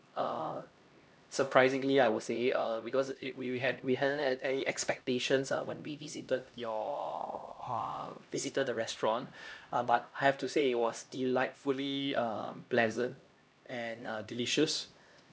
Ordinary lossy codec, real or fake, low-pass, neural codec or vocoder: none; fake; none; codec, 16 kHz, 1 kbps, X-Codec, HuBERT features, trained on LibriSpeech